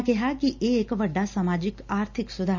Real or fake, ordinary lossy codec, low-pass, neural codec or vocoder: real; none; 7.2 kHz; none